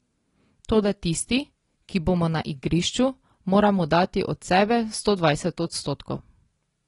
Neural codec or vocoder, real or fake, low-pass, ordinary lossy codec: none; real; 10.8 kHz; AAC, 32 kbps